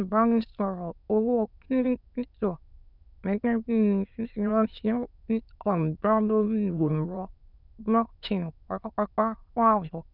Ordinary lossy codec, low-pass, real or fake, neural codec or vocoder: none; 5.4 kHz; fake; autoencoder, 22.05 kHz, a latent of 192 numbers a frame, VITS, trained on many speakers